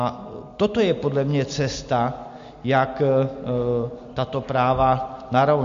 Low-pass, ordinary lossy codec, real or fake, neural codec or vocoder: 7.2 kHz; MP3, 48 kbps; real; none